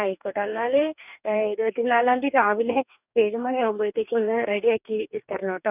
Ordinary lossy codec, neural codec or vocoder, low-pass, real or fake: none; codec, 44.1 kHz, 2.6 kbps, DAC; 3.6 kHz; fake